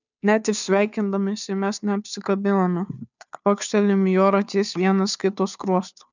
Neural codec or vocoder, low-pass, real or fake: codec, 16 kHz, 2 kbps, FunCodec, trained on Chinese and English, 25 frames a second; 7.2 kHz; fake